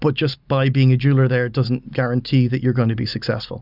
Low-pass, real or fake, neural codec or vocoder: 5.4 kHz; real; none